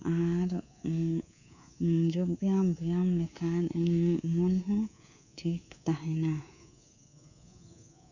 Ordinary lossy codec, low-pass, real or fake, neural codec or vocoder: none; 7.2 kHz; fake; codec, 24 kHz, 3.1 kbps, DualCodec